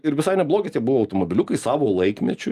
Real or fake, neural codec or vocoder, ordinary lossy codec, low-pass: real; none; Opus, 32 kbps; 14.4 kHz